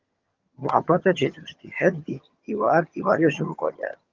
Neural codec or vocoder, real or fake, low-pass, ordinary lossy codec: vocoder, 22.05 kHz, 80 mel bands, HiFi-GAN; fake; 7.2 kHz; Opus, 32 kbps